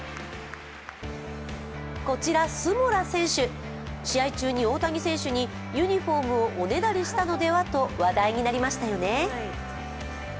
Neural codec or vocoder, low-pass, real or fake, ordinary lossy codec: none; none; real; none